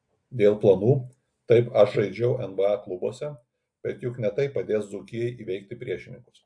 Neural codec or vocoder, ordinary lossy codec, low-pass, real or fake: none; AAC, 64 kbps; 9.9 kHz; real